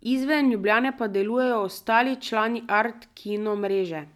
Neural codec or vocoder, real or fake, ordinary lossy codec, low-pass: none; real; none; 19.8 kHz